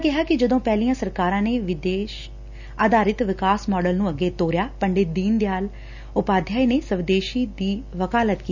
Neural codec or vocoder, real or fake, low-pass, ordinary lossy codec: none; real; 7.2 kHz; none